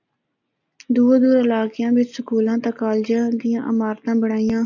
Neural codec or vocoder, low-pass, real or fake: none; 7.2 kHz; real